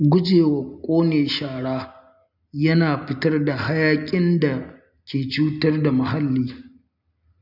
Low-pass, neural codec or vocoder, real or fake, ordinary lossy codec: 5.4 kHz; none; real; none